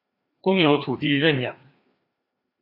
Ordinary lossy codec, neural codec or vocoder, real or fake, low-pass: Opus, 64 kbps; codec, 16 kHz, 2 kbps, FreqCodec, larger model; fake; 5.4 kHz